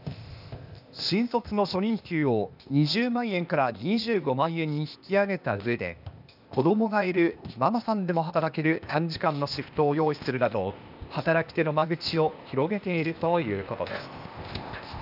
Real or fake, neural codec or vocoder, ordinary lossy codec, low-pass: fake; codec, 16 kHz, 0.8 kbps, ZipCodec; none; 5.4 kHz